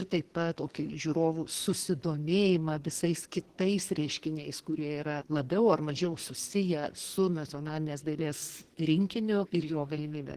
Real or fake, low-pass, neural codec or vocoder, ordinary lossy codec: fake; 14.4 kHz; codec, 44.1 kHz, 2.6 kbps, SNAC; Opus, 16 kbps